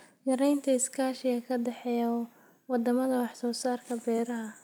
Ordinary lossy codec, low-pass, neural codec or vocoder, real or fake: none; none; vocoder, 44.1 kHz, 128 mel bands every 256 samples, BigVGAN v2; fake